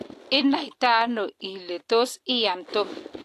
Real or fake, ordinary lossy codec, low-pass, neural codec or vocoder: real; AAC, 64 kbps; 14.4 kHz; none